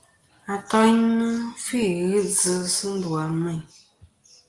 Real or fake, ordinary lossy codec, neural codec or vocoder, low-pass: real; Opus, 24 kbps; none; 10.8 kHz